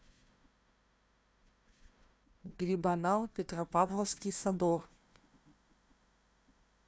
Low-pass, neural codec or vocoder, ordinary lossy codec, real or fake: none; codec, 16 kHz, 1 kbps, FunCodec, trained on Chinese and English, 50 frames a second; none; fake